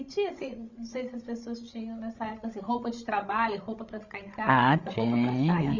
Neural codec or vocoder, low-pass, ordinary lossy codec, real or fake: codec, 16 kHz, 16 kbps, FreqCodec, larger model; 7.2 kHz; Opus, 64 kbps; fake